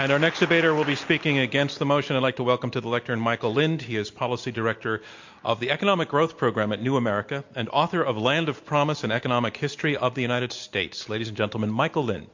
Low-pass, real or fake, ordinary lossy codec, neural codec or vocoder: 7.2 kHz; real; MP3, 48 kbps; none